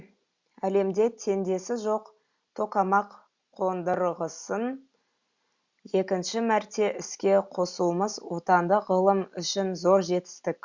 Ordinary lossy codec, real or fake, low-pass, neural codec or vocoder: Opus, 64 kbps; real; 7.2 kHz; none